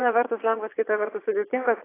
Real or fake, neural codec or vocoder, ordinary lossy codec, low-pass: fake; vocoder, 22.05 kHz, 80 mel bands, Vocos; AAC, 24 kbps; 3.6 kHz